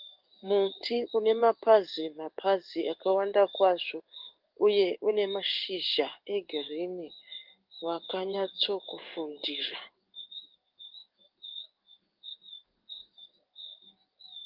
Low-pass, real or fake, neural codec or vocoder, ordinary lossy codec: 5.4 kHz; fake; codec, 16 kHz in and 24 kHz out, 1 kbps, XY-Tokenizer; Opus, 24 kbps